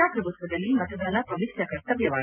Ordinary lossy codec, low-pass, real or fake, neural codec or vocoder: none; 3.6 kHz; real; none